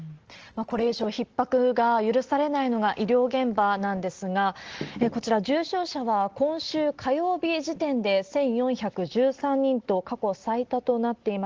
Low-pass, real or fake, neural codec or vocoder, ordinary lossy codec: 7.2 kHz; real; none; Opus, 16 kbps